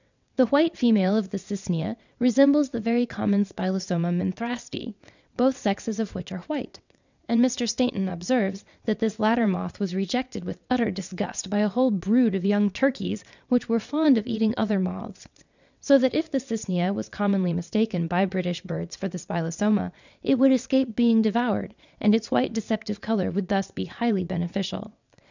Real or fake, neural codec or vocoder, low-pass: fake; vocoder, 22.05 kHz, 80 mel bands, WaveNeXt; 7.2 kHz